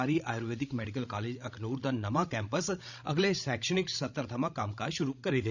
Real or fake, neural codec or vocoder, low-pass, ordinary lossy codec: fake; codec, 16 kHz, 16 kbps, FreqCodec, larger model; 7.2 kHz; none